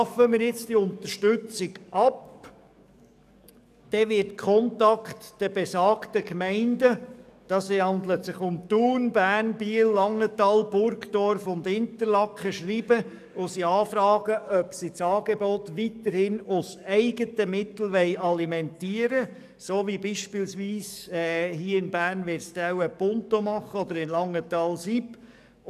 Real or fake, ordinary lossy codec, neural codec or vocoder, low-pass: fake; none; codec, 44.1 kHz, 7.8 kbps, DAC; 14.4 kHz